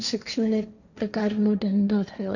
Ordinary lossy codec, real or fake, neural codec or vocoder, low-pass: none; fake; codec, 16 kHz, 1.1 kbps, Voila-Tokenizer; 7.2 kHz